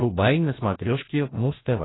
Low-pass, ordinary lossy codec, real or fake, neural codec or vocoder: 7.2 kHz; AAC, 16 kbps; fake; codec, 16 kHz, 1 kbps, FreqCodec, larger model